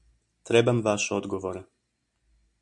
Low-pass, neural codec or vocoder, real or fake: 10.8 kHz; none; real